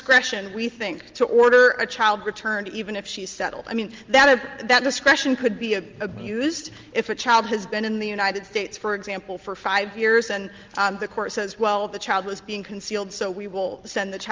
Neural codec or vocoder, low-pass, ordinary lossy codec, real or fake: none; 7.2 kHz; Opus, 24 kbps; real